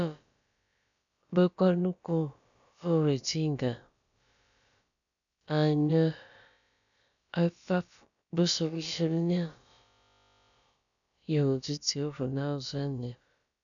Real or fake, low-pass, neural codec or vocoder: fake; 7.2 kHz; codec, 16 kHz, about 1 kbps, DyCAST, with the encoder's durations